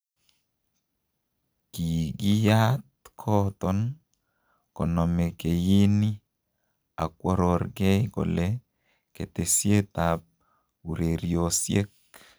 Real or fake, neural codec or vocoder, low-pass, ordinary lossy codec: real; none; none; none